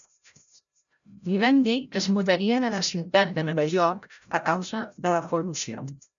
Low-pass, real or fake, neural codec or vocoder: 7.2 kHz; fake; codec, 16 kHz, 0.5 kbps, FreqCodec, larger model